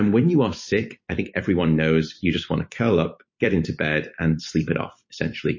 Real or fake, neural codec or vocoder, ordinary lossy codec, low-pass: fake; vocoder, 44.1 kHz, 128 mel bands every 256 samples, BigVGAN v2; MP3, 32 kbps; 7.2 kHz